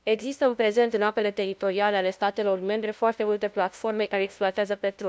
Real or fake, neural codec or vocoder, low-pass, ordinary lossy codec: fake; codec, 16 kHz, 0.5 kbps, FunCodec, trained on LibriTTS, 25 frames a second; none; none